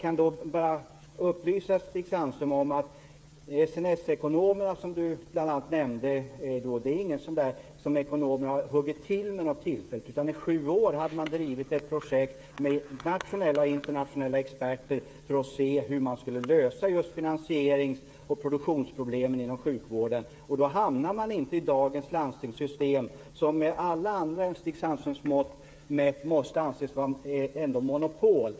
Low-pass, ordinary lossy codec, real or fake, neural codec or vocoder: none; none; fake; codec, 16 kHz, 8 kbps, FreqCodec, smaller model